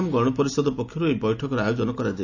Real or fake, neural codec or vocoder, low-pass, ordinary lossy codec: real; none; 7.2 kHz; none